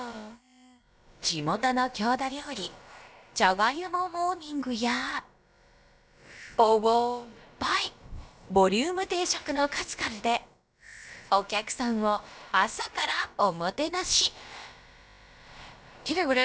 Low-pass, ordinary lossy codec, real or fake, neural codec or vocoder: none; none; fake; codec, 16 kHz, about 1 kbps, DyCAST, with the encoder's durations